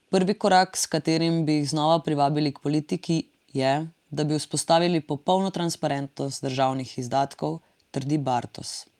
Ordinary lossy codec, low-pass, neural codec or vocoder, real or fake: Opus, 32 kbps; 19.8 kHz; none; real